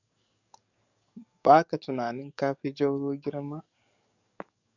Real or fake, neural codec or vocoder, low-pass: fake; codec, 44.1 kHz, 7.8 kbps, DAC; 7.2 kHz